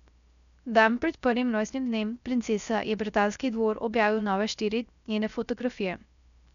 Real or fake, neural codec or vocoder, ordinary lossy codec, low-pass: fake; codec, 16 kHz, 0.3 kbps, FocalCodec; none; 7.2 kHz